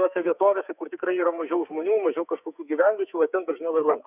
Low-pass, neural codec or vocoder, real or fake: 3.6 kHz; codec, 44.1 kHz, 2.6 kbps, SNAC; fake